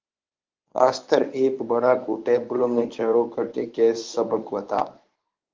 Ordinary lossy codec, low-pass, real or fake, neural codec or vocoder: Opus, 32 kbps; 7.2 kHz; fake; codec, 24 kHz, 0.9 kbps, WavTokenizer, medium speech release version 1